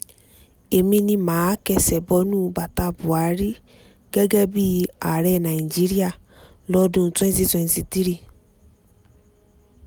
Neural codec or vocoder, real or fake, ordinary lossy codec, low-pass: none; real; none; none